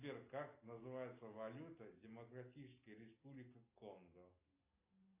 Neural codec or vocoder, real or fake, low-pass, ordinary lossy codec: none; real; 3.6 kHz; AAC, 24 kbps